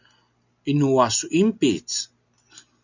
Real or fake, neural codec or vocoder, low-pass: real; none; 7.2 kHz